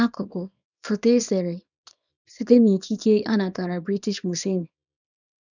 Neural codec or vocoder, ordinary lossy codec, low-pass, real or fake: codec, 24 kHz, 0.9 kbps, WavTokenizer, small release; none; 7.2 kHz; fake